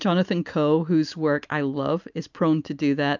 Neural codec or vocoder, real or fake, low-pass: none; real; 7.2 kHz